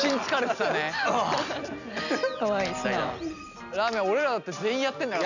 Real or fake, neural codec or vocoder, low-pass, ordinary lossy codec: real; none; 7.2 kHz; none